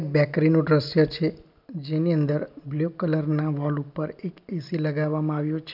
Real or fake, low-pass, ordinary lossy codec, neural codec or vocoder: real; 5.4 kHz; none; none